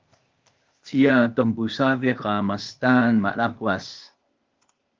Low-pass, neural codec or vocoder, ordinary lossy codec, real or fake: 7.2 kHz; codec, 16 kHz, 0.8 kbps, ZipCodec; Opus, 24 kbps; fake